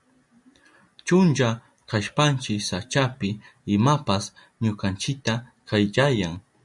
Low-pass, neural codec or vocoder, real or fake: 10.8 kHz; none; real